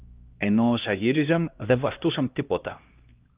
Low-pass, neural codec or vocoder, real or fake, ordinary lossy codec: 3.6 kHz; codec, 16 kHz, 1 kbps, X-Codec, HuBERT features, trained on LibriSpeech; fake; Opus, 32 kbps